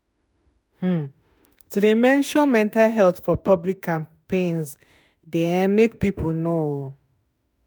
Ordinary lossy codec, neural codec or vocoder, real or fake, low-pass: none; autoencoder, 48 kHz, 32 numbers a frame, DAC-VAE, trained on Japanese speech; fake; none